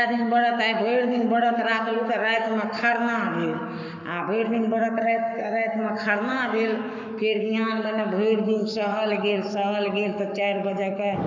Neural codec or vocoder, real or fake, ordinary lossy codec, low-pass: codec, 44.1 kHz, 7.8 kbps, Pupu-Codec; fake; none; 7.2 kHz